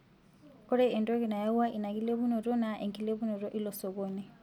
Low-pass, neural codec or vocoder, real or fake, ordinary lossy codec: 19.8 kHz; none; real; none